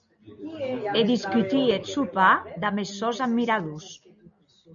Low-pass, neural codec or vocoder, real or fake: 7.2 kHz; none; real